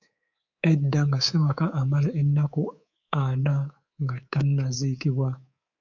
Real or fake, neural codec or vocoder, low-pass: fake; codec, 24 kHz, 3.1 kbps, DualCodec; 7.2 kHz